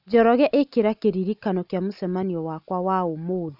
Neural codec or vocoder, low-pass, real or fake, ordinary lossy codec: none; 5.4 kHz; real; none